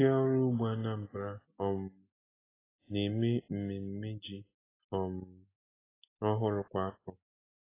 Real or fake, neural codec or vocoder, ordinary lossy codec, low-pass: real; none; AAC, 16 kbps; 3.6 kHz